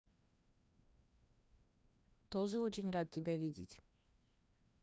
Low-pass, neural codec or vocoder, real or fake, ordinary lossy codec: none; codec, 16 kHz, 1 kbps, FreqCodec, larger model; fake; none